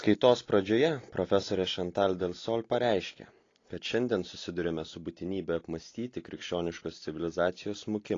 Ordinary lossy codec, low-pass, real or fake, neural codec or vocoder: AAC, 32 kbps; 7.2 kHz; real; none